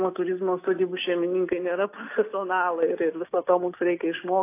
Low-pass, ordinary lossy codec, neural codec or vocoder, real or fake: 3.6 kHz; AAC, 24 kbps; none; real